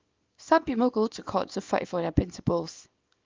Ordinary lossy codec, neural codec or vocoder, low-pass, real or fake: Opus, 24 kbps; codec, 24 kHz, 0.9 kbps, WavTokenizer, small release; 7.2 kHz; fake